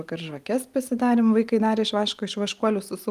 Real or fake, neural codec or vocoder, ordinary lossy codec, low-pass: real; none; Opus, 32 kbps; 14.4 kHz